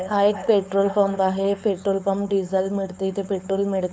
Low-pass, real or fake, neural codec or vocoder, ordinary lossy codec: none; fake; codec, 16 kHz, 4.8 kbps, FACodec; none